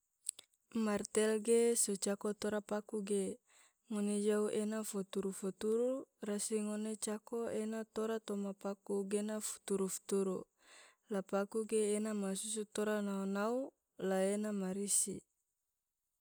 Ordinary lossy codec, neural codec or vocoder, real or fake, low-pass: none; none; real; none